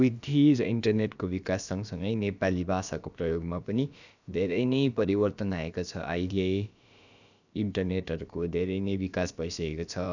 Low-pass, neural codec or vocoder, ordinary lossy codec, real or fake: 7.2 kHz; codec, 16 kHz, about 1 kbps, DyCAST, with the encoder's durations; none; fake